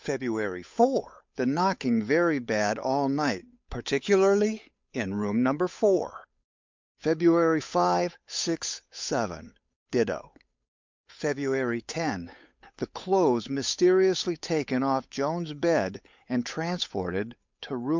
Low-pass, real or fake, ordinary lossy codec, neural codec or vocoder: 7.2 kHz; fake; MP3, 64 kbps; codec, 16 kHz, 8 kbps, FunCodec, trained on Chinese and English, 25 frames a second